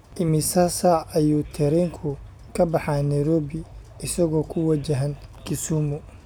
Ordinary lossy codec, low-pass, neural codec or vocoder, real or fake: none; none; none; real